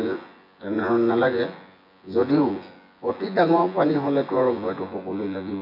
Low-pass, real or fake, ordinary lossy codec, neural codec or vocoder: 5.4 kHz; fake; none; vocoder, 24 kHz, 100 mel bands, Vocos